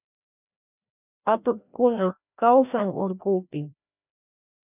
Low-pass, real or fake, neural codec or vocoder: 3.6 kHz; fake; codec, 16 kHz, 1 kbps, FreqCodec, larger model